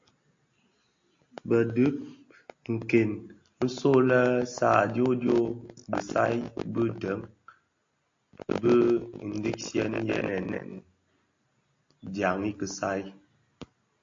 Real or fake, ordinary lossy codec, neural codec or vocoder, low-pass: real; AAC, 48 kbps; none; 7.2 kHz